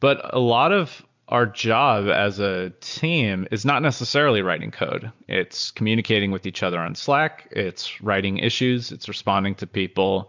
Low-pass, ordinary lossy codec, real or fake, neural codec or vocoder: 7.2 kHz; MP3, 64 kbps; real; none